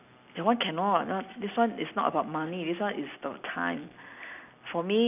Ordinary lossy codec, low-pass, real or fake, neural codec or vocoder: none; 3.6 kHz; real; none